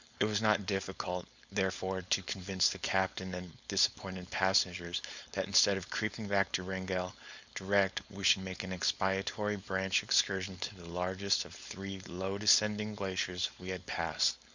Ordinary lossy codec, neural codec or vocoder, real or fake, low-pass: Opus, 64 kbps; codec, 16 kHz, 4.8 kbps, FACodec; fake; 7.2 kHz